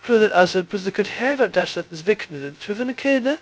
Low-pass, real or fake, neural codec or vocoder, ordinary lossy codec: none; fake; codec, 16 kHz, 0.2 kbps, FocalCodec; none